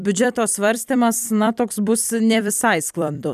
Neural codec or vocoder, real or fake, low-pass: vocoder, 44.1 kHz, 128 mel bands every 256 samples, BigVGAN v2; fake; 14.4 kHz